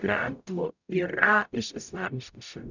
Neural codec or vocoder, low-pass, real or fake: codec, 44.1 kHz, 0.9 kbps, DAC; 7.2 kHz; fake